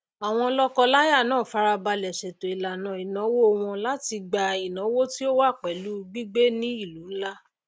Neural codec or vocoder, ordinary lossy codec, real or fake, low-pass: none; none; real; none